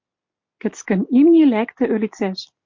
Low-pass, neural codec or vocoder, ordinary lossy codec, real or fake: 7.2 kHz; none; MP3, 48 kbps; real